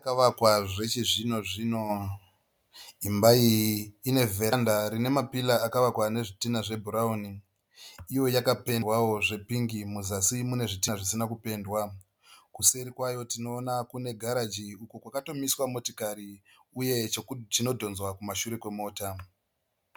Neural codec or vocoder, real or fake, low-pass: none; real; 19.8 kHz